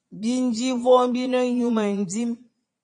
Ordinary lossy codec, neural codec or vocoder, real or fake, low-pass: MP3, 48 kbps; vocoder, 22.05 kHz, 80 mel bands, Vocos; fake; 9.9 kHz